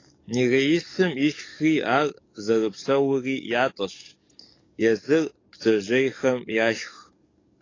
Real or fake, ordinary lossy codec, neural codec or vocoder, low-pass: fake; AAC, 32 kbps; codec, 44.1 kHz, 7.8 kbps, DAC; 7.2 kHz